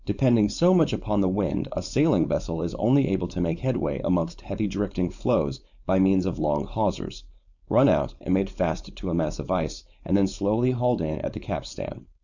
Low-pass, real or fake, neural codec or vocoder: 7.2 kHz; fake; codec, 16 kHz, 4.8 kbps, FACodec